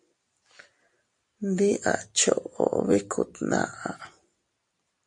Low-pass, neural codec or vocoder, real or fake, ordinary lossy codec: 10.8 kHz; none; real; MP3, 48 kbps